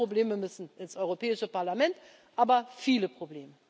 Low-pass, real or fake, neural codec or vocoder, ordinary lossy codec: none; real; none; none